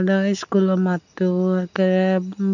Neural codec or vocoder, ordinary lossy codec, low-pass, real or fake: none; none; 7.2 kHz; real